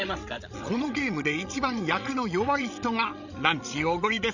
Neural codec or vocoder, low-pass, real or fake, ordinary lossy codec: codec, 16 kHz, 16 kbps, FreqCodec, larger model; 7.2 kHz; fake; none